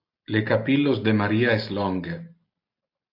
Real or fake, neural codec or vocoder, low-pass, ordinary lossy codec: real; none; 5.4 kHz; AAC, 32 kbps